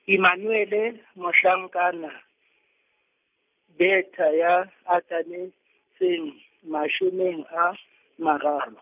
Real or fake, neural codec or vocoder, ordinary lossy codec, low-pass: real; none; none; 3.6 kHz